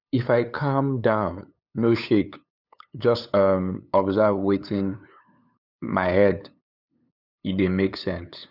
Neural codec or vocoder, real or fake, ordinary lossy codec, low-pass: codec, 16 kHz, 8 kbps, FunCodec, trained on LibriTTS, 25 frames a second; fake; none; 5.4 kHz